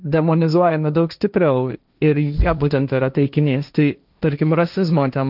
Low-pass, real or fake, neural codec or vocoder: 5.4 kHz; fake; codec, 16 kHz, 1.1 kbps, Voila-Tokenizer